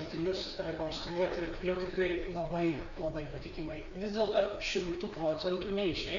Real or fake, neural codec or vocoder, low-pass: fake; codec, 16 kHz, 2 kbps, FreqCodec, larger model; 7.2 kHz